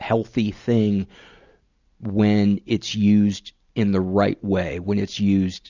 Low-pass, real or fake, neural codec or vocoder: 7.2 kHz; real; none